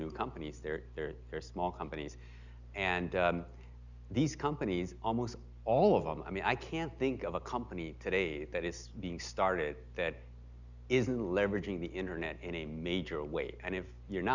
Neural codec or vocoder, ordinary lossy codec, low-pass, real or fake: none; Opus, 64 kbps; 7.2 kHz; real